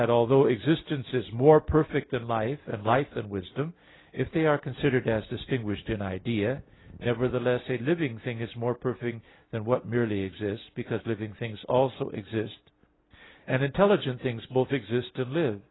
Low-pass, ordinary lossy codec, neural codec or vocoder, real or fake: 7.2 kHz; AAC, 16 kbps; none; real